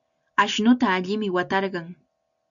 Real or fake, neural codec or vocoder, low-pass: real; none; 7.2 kHz